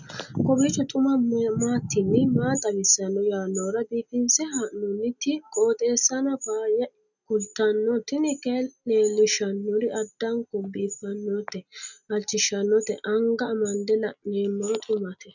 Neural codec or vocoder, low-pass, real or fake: none; 7.2 kHz; real